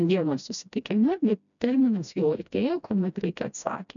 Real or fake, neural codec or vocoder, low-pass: fake; codec, 16 kHz, 1 kbps, FreqCodec, smaller model; 7.2 kHz